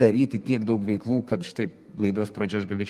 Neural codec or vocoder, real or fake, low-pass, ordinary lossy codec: codec, 32 kHz, 1.9 kbps, SNAC; fake; 14.4 kHz; Opus, 32 kbps